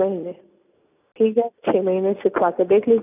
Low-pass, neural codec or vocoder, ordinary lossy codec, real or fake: 3.6 kHz; none; none; real